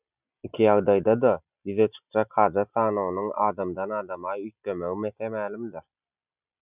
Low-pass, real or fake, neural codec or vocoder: 3.6 kHz; real; none